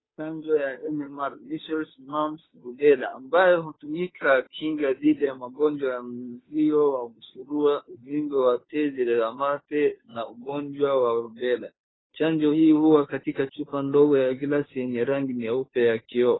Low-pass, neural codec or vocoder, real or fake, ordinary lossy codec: 7.2 kHz; codec, 16 kHz, 2 kbps, FunCodec, trained on Chinese and English, 25 frames a second; fake; AAC, 16 kbps